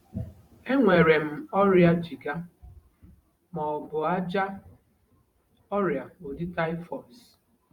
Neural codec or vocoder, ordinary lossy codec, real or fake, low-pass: vocoder, 44.1 kHz, 128 mel bands every 512 samples, BigVGAN v2; Opus, 64 kbps; fake; 19.8 kHz